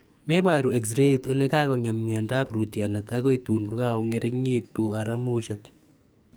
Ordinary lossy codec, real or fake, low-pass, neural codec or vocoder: none; fake; none; codec, 44.1 kHz, 2.6 kbps, SNAC